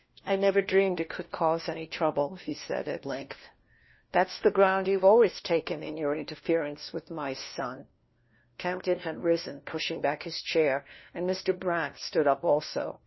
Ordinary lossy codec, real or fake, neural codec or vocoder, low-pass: MP3, 24 kbps; fake; codec, 16 kHz, 1 kbps, FunCodec, trained on LibriTTS, 50 frames a second; 7.2 kHz